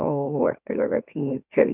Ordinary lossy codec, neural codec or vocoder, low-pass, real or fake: none; autoencoder, 44.1 kHz, a latent of 192 numbers a frame, MeloTTS; 3.6 kHz; fake